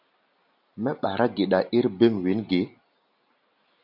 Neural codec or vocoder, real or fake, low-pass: none; real; 5.4 kHz